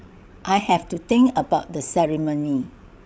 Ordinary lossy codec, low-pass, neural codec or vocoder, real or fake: none; none; codec, 16 kHz, 16 kbps, FreqCodec, larger model; fake